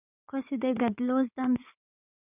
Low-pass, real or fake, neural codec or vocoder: 3.6 kHz; fake; codec, 16 kHz in and 24 kHz out, 2.2 kbps, FireRedTTS-2 codec